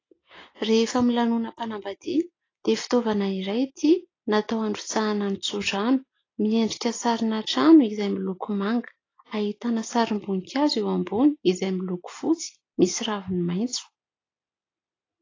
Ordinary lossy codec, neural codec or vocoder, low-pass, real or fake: AAC, 32 kbps; none; 7.2 kHz; real